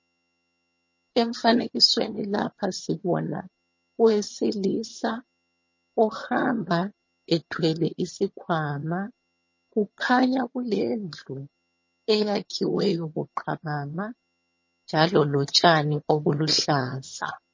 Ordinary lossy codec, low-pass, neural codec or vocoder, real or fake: MP3, 32 kbps; 7.2 kHz; vocoder, 22.05 kHz, 80 mel bands, HiFi-GAN; fake